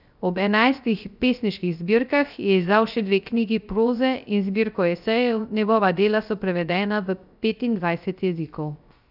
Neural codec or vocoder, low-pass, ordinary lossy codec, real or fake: codec, 16 kHz, 0.3 kbps, FocalCodec; 5.4 kHz; none; fake